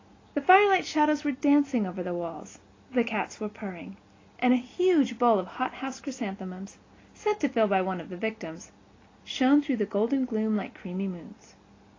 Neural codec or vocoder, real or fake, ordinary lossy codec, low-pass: none; real; AAC, 32 kbps; 7.2 kHz